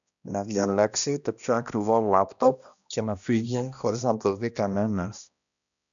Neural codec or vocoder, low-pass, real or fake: codec, 16 kHz, 1 kbps, X-Codec, HuBERT features, trained on balanced general audio; 7.2 kHz; fake